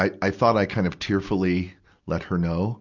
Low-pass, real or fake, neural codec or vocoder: 7.2 kHz; real; none